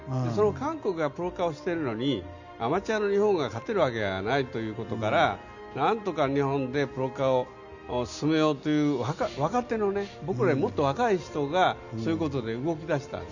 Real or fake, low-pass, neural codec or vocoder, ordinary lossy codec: real; 7.2 kHz; none; none